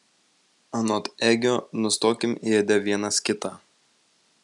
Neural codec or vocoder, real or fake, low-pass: none; real; 10.8 kHz